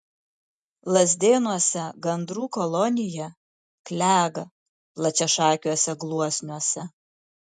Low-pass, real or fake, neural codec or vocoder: 10.8 kHz; real; none